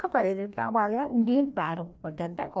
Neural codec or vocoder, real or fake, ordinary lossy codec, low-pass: codec, 16 kHz, 1 kbps, FreqCodec, larger model; fake; none; none